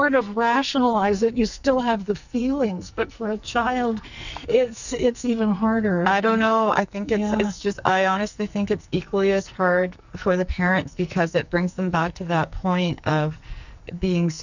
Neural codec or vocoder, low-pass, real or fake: codec, 44.1 kHz, 2.6 kbps, SNAC; 7.2 kHz; fake